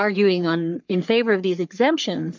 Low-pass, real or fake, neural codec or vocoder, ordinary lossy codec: 7.2 kHz; fake; codec, 44.1 kHz, 3.4 kbps, Pupu-Codec; MP3, 64 kbps